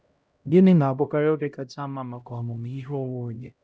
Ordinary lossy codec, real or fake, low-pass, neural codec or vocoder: none; fake; none; codec, 16 kHz, 0.5 kbps, X-Codec, HuBERT features, trained on LibriSpeech